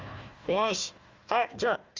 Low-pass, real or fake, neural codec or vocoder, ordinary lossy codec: 7.2 kHz; fake; codec, 16 kHz, 1 kbps, FunCodec, trained on Chinese and English, 50 frames a second; Opus, 32 kbps